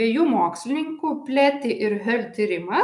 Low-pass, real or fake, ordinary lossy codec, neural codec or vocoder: 10.8 kHz; real; MP3, 96 kbps; none